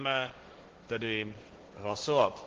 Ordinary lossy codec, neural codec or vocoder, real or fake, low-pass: Opus, 16 kbps; codec, 16 kHz, 1.1 kbps, Voila-Tokenizer; fake; 7.2 kHz